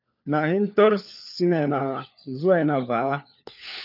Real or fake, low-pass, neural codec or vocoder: fake; 5.4 kHz; codec, 16 kHz, 4 kbps, FunCodec, trained on LibriTTS, 50 frames a second